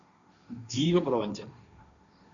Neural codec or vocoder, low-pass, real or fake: codec, 16 kHz, 1.1 kbps, Voila-Tokenizer; 7.2 kHz; fake